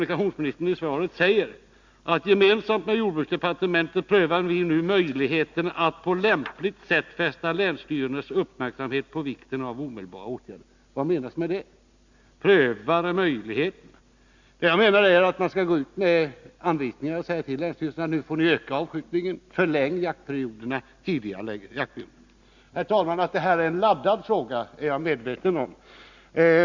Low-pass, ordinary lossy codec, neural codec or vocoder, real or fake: 7.2 kHz; Opus, 64 kbps; none; real